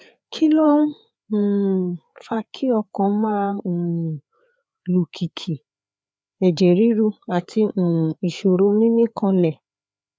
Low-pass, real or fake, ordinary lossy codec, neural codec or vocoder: none; fake; none; codec, 16 kHz, 4 kbps, FreqCodec, larger model